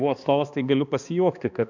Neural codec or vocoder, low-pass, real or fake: codec, 16 kHz, 2 kbps, X-Codec, HuBERT features, trained on balanced general audio; 7.2 kHz; fake